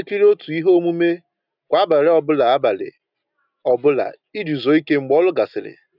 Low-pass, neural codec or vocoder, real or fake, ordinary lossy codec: 5.4 kHz; none; real; none